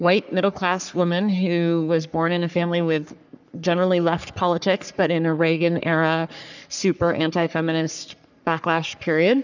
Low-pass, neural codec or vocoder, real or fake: 7.2 kHz; codec, 44.1 kHz, 3.4 kbps, Pupu-Codec; fake